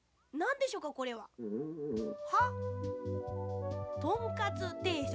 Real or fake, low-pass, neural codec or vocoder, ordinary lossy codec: real; none; none; none